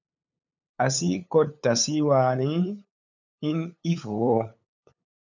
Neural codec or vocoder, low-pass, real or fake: codec, 16 kHz, 8 kbps, FunCodec, trained on LibriTTS, 25 frames a second; 7.2 kHz; fake